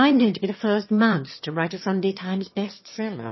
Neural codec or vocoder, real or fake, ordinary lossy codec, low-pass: autoencoder, 22.05 kHz, a latent of 192 numbers a frame, VITS, trained on one speaker; fake; MP3, 24 kbps; 7.2 kHz